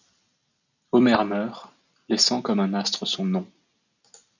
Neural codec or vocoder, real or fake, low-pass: none; real; 7.2 kHz